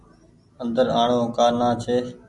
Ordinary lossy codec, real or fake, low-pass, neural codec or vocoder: MP3, 96 kbps; real; 10.8 kHz; none